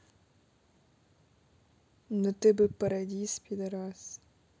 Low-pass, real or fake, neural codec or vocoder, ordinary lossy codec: none; real; none; none